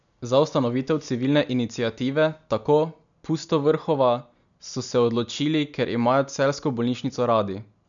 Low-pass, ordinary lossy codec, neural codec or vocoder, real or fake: 7.2 kHz; none; none; real